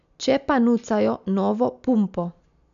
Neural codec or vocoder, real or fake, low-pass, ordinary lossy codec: none; real; 7.2 kHz; none